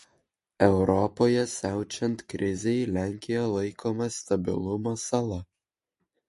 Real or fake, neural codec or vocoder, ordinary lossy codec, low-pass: real; none; MP3, 48 kbps; 14.4 kHz